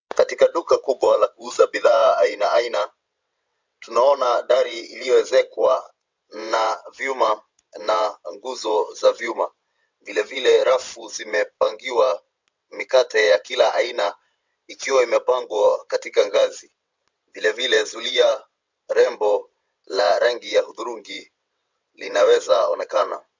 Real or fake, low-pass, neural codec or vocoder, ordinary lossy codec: fake; 7.2 kHz; vocoder, 22.05 kHz, 80 mel bands, WaveNeXt; MP3, 64 kbps